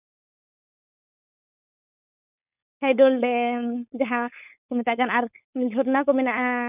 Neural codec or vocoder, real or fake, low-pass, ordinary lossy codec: codec, 16 kHz, 4.8 kbps, FACodec; fake; 3.6 kHz; none